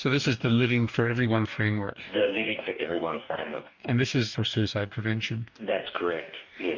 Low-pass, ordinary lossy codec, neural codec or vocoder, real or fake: 7.2 kHz; MP3, 64 kbps; codec, 44.1 kHz, 2.6 kbps, DAC; fake